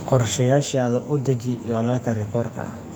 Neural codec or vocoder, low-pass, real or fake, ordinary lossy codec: codec, 44.1 kHz, 2.6 kbps, SNAC; none; fake; none